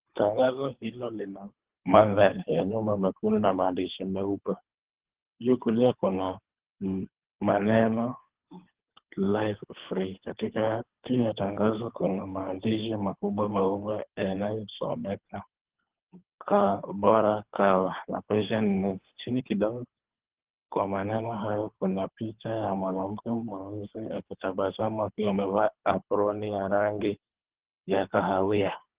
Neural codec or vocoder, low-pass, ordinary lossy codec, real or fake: codec, 24 kHz, 3 kbps, HILCodec; 3.6 kHz; Opus, 16 kbps; fake